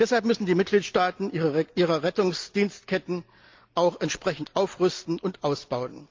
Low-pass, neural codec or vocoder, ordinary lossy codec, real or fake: 7.2 kHz; none; Opus, 24 kbps; real